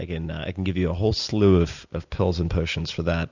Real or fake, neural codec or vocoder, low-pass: real; none; 7.2 kHz